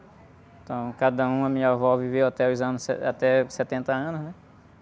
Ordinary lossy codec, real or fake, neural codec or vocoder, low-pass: none; real; none; none